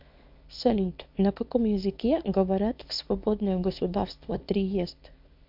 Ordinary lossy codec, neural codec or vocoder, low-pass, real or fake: MP3, 48 kbps; codec, 24 kHz, 0.9 kbps, WavTokenizer, small release; 5.4 kHz; fake